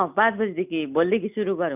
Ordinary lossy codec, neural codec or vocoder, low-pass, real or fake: none; none; 3.6 kHz; real